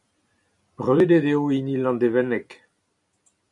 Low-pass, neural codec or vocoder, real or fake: 10.8 kHz; vocoder, 44.1 kHz, 128 mel bands every 256 samples, BigVGAN v2; fake